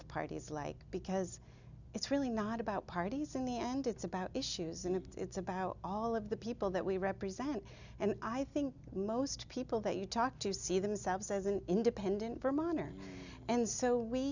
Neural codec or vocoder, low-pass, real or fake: none; 7.2 kHz; real